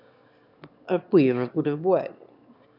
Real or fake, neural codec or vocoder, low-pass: fake; autoencoder, 22.05 kHz, a latent of 192 numbers a frame, VITS, trained on one speaker; 5.4 kHz